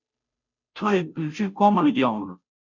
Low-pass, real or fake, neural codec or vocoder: 7.2 kHz; fake; codec, 16 kHz, 0.5 kbps, FunCodec, trained on Chinese and English, 25 frames a second